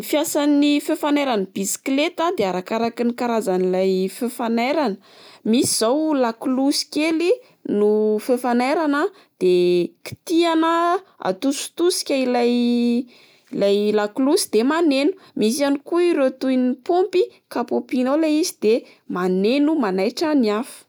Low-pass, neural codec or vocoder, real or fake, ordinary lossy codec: none; none; real; none